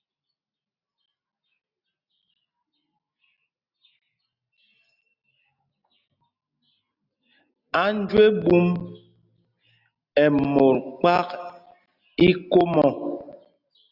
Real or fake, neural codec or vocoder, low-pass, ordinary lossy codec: real; none; 5.4 kHz; Opus, 64 kbps